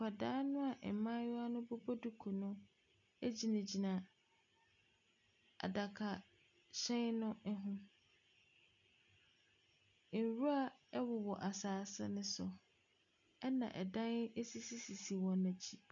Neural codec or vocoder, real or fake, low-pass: none; real; 7.2 kHz